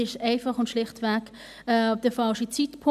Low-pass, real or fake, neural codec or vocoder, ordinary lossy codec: 14.4 kHz; real; none; none